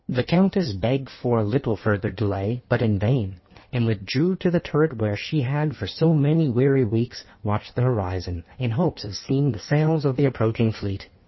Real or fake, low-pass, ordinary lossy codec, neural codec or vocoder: fake; 7.2 kHz; MP3, 24 kbps; codec, 16 kHz in and 24 kHz out, 1.1 kbps, FireRedTTS-2 codec